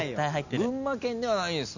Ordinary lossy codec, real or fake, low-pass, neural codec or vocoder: none; real; 7.2 kHz; none